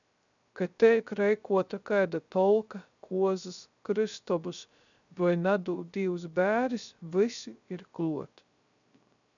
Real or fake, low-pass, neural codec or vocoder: fake; 7.2 kHz; codec, 16 kHz, 0.3 kbps, FocalCodec